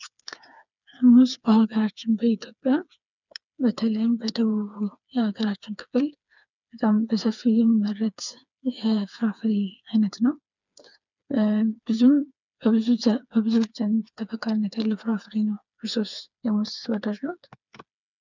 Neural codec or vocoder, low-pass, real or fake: codec, 16 kHz, 4 kbps, FreqCodec, smaller model; 7.2 kHz; fake